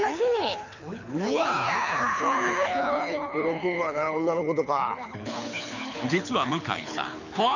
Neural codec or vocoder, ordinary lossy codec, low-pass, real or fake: codec, 24 kHz, 6 kbps, HILCodec; none; 7.2 kHz; fake